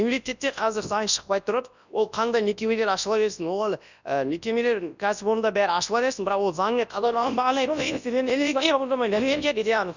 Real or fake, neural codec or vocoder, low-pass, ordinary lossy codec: fake; codec, 24 kHz, 0.9 kbps, WavTokenizer, large speech release; 7.2 kHz; none